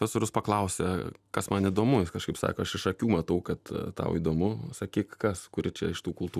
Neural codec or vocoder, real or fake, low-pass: none; real; 14.4 kHz